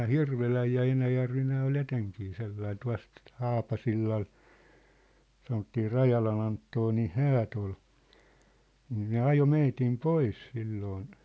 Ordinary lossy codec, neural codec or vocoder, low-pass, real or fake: none; none; none; real